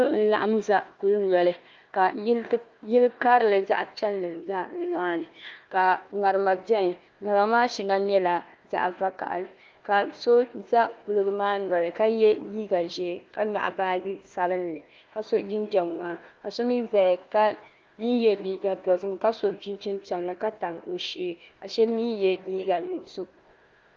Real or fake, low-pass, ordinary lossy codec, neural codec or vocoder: fake; 7.2 kHz; Opus, 32 kbps; codec, 16 kHz, 1 kbps, FunCodec, trained on Chinese and English, 50 frames a second